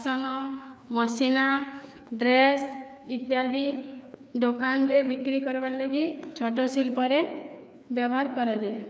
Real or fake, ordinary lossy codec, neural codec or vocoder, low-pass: fake; none; codec, 16 kHz, 2 kbps, FreqCodec, larger model; none